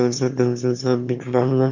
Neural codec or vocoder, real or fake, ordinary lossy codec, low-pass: autoencoder, 22.05 kHz, a latent of 192 numbers a frame, VITS, trained on one speaker; fake; none; 7.2 kHz